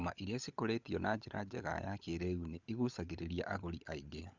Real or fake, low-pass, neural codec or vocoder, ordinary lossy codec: fake; 7.2 kHz; codec, 16 kHz, 16 kbps, FunCodec, trained on LibriTTS, 50 frames a second; Opus, 64 kbps